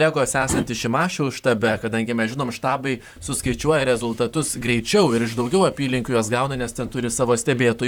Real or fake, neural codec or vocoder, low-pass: fake; vocoder, 44.1 kHz, 128 mel bands, Pupu-Vocoder; 19.8 kHz